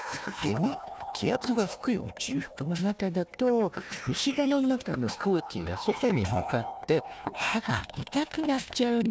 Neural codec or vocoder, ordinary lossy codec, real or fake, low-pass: codec, 16 kHz, 1 kbps, FunCodec, trained on Chinese and English, 50 frames a second; none; fake; none